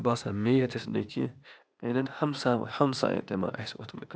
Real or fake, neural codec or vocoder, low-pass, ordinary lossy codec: fake; codec, 16 kHz, 0.8 kbps, ZipCodec; none; none